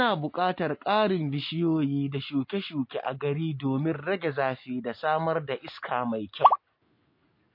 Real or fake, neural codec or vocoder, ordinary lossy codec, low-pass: real; none; MP3, 32 kbps; 5.4 kHz